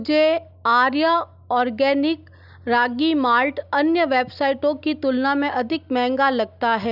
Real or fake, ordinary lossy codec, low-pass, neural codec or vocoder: real; none; 5.4 kHz; none